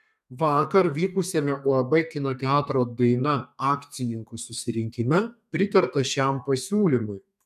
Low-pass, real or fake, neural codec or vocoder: 14.4 kHz; fake; codec, 32 kHz, 1.9 kbps, SNAC